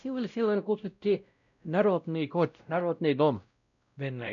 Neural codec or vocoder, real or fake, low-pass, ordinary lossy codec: codec, 16 kHz, 0.5 kbps, X-Codec, WavLM features, trained on Multilingual LibriSpeech; fake; 7.2 kHz; none